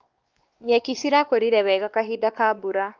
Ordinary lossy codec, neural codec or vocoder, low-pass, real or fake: Opus, 32 kbps; codec, 16 kHz, 2 kbps, X-Codec, WavLM features, trained on Multilingual LibriSpeech; 7.2 kHz; fake